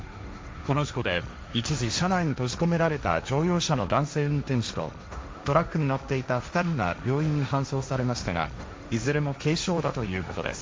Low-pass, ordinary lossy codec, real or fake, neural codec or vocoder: none; none; fake; codec, 16 kHz, 1.1 kbps, Voila-Tokenizer